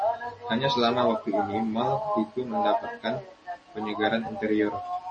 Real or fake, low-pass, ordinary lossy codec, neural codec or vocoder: real; 10.8 kHz; MP3, 32 kbps; none